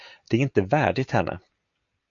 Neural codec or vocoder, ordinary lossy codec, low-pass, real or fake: none; AAC, 64 kbps; 7.2 kHz; real